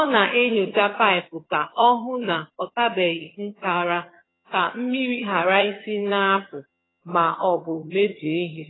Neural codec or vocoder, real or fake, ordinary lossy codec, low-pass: vocoder, 22.05 kHz, 80 mel bands, HiFi-GAN; fake; AAC, 16 kbps; 7.2 kHz